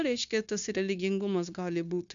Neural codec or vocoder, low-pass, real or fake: codec, 16 kHz, 0.9 kbps, LongCat-Audio-Codec; 7.2 kHz; fake